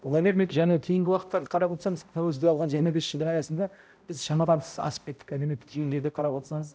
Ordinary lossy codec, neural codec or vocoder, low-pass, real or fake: none; codec, 16 kHz, 0.5 kbps, X-Codec, HuBERT features, trained on balanced general audio; none; fake